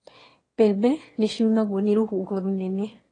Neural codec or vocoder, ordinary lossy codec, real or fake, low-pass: autoencoder, 22.05 kHz, a latent of 192 numbers a frame, VITS, trained on one speaker; AAC, 32 kbps; fake; 9.9 kHz